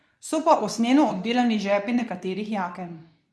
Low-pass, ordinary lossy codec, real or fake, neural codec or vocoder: none; none; fake; codec, 24 kHz, 0.9 kbps, WavTokenizer, medium speech release version 2